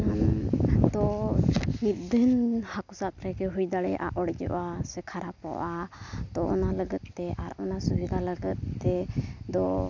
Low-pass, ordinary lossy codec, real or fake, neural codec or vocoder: 7.2 kHz; none; real; none